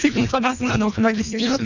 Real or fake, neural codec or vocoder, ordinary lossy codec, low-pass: fake; codec, 24 kHz, 1.5 kbps, HILCodec; none; 7.2 kHz